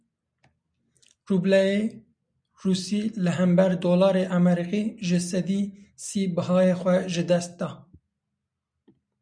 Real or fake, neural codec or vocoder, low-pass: real; none; 9.9 kHz